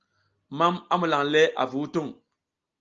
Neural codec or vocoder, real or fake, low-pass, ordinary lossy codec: none; real; 7.2 kHz; Opus, 24 kbps